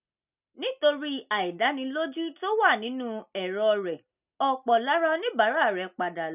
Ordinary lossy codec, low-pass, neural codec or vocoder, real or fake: none; 3.6 kHz; none; real